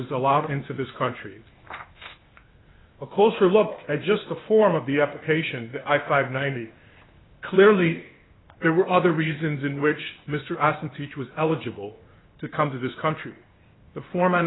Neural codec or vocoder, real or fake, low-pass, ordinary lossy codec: codec, 16 kHz, 0.8 kbps, ZipCodec; fake; 7.2 kHz; AAC, 16 kbps